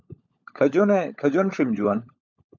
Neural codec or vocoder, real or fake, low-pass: codec, 16 kHz, 16 kbps, FunCodec, trained on LibriTTS, 50 frames a second; fake; 7.2 kHz